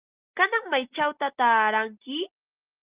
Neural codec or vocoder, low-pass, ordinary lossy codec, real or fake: none; 3.6 kHz; Opus, 32 kbps; real